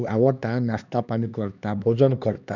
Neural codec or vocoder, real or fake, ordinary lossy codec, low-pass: codec, 16 kHz, 2 kbps, X-Codec, HuBERT features, trained on LibriSpeech; fake; none; 7.2 kHz